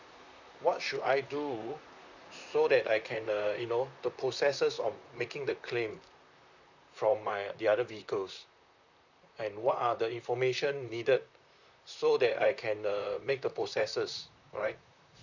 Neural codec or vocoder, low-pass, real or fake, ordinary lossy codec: vocoder, 44.1 kHz, 128 mel bands, Pupu-Vocoder; 7.2 kHz; fake; none